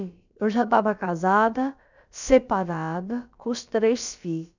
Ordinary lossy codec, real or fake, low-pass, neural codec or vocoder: none; fake; 7.2 kHz; codec, 16 kHz, about 1 kbps, DyCAST, with the encoder's durations